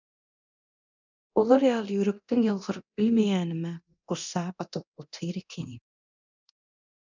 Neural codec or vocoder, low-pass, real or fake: codec, 24 kHz, 0.9 kbps, DualCodec; 7.2 kHz; fake